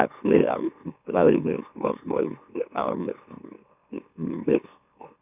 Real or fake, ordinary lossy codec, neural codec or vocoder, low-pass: fake; none; autoencoder, 44.1 kHz, a latent of 192 numbers a frame, MeloTTS; 3.6 kHz